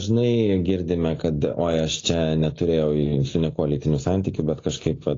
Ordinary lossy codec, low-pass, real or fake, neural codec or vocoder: AAC, 32 kbps; 7.2 kHz; real; none